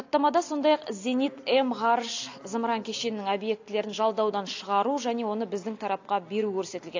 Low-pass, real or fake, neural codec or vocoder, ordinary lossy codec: 7.2 kHz; real; none; AAC, 48 kbps